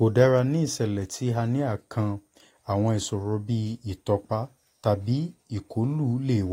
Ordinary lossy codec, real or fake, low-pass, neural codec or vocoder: AAC, 48 kbps; real; 19.8 kHz; none